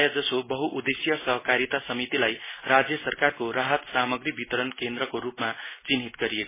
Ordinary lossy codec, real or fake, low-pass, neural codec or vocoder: MP3, 16 kbps; real; 3.6 kHz; none